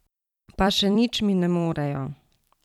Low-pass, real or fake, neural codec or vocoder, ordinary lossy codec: 19.8 kHz; fake; vocoder, 44.1 kHz, 128 mel bands every 256 samples, BigVGAN v2; none